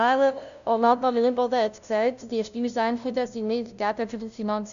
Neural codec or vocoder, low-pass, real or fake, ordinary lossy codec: codec, 16 kHz, 0.5 kbps, FunCodec, trained on LibriTTS, 25 frames a second; 7.2 kHz; fake; none